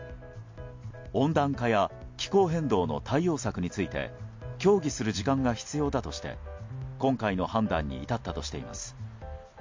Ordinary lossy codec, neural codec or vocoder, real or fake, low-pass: MP3, 48 kbps; none; real; 7.2 kHz